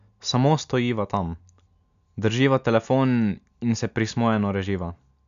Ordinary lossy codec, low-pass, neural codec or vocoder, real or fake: MP3, 96 kbps; 7.2 kHz; none; real